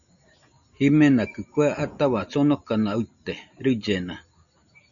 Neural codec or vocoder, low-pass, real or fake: none; 7.2 kHz; real